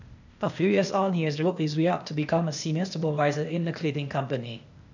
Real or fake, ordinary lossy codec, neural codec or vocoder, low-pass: fake; none; codec, 16 kHz, 0.8 kbps, ZipCodec; 7.2 kHz